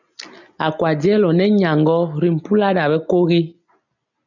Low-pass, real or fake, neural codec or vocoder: 7.2 kHz; real; none